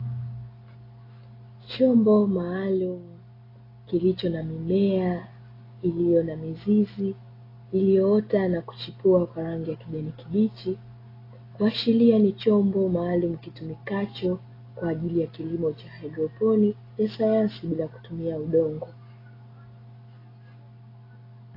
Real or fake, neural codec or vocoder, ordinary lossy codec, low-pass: real; none; AAC, 24 kbps; 5.4 kHz